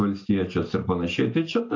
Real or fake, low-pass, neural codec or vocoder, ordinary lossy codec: real; 7.2 kHz; none; AAC, 48 kbps